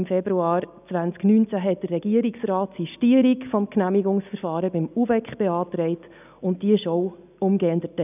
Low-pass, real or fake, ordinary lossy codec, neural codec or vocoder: 3.6 kHz; real; none; none